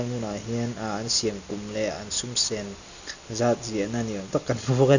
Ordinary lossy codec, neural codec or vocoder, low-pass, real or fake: none; none; 7.2 kHz; real